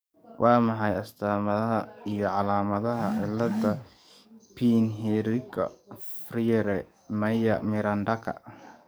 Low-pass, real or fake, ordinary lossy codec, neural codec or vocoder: none; fake; none; codec, 44.1 kHz, 7.8 kbps, DAC